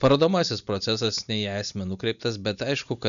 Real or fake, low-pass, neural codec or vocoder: real; 7.2 kHz; none